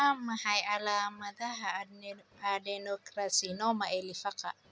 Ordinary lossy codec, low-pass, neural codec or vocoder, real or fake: none; none; none; real